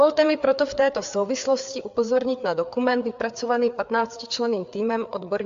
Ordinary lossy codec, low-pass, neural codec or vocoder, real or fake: AAC, 64 kbps; 7.2 kHz; codec, 16 kHz, 4 kbps, FreqCodec, larger model; fake